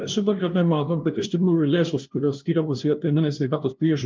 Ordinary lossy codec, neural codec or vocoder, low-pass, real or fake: Opus, 32 kbps; codec, 16 kHz, 0.5 kbps, FunCodec, trained on LibriTTS, 25 frames a second; 7.2 kHz; fake